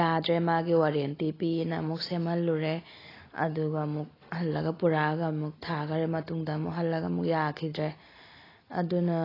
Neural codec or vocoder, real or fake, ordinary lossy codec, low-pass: none; real; AAC, 24 kbps; 5.4 kHz